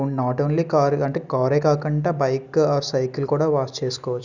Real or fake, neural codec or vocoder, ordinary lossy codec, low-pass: real; none; none; 7.2 kHz